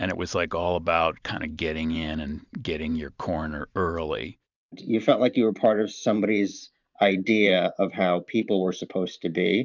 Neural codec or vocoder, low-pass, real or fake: vocoder, 44.1 kHz, 128 mel bands every 512 samples, BigVGAN v2; 7.2 kHz; fake